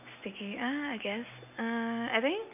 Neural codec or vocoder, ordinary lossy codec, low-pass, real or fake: none; none; 3.6 kHz; real